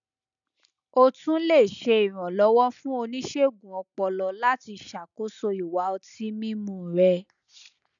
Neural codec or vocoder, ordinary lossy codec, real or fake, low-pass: none; none; real; 7.2 kHz